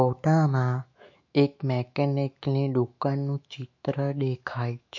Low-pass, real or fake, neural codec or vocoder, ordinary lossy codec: 7.2 kHz; fake; autoencoder, 48 kHz, 128 numbers a frame, DAC-VAE, trained on Japanese speech; MP3, 48 kbps